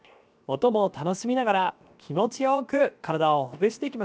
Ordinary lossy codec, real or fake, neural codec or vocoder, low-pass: none; fake; codec, 16 kHz, 0.7 kbps, FocalCodec; none